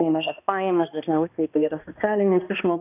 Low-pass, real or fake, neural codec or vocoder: 3.6 kHz; fake; codec, 16 kHz, 1 kbps, X-Codec, HuBERT features, trained on balanced general audio